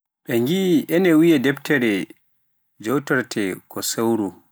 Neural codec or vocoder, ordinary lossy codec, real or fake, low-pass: none; none; real; none